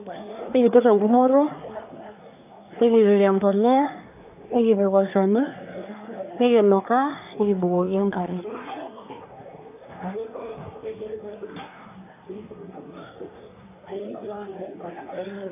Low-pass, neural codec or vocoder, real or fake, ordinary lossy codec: 3.6 kHz; codec, 24 kHz, 1 kbps, SNAC; fake; AAC, 32 kbps